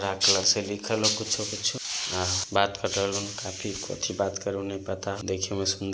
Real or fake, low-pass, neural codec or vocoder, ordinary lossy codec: real; none; none; none